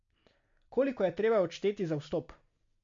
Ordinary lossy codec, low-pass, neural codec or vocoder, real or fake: MP3, 48 kbps; 7.2 kHz; none; real